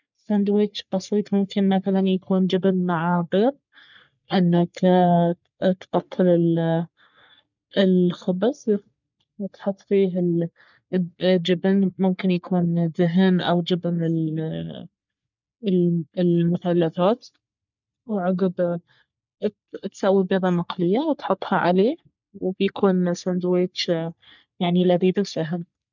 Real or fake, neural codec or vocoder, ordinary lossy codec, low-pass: fake; codec, 44.1 kHz, 3.4 kbps, Pupu-Codec; none; 7.2 kHz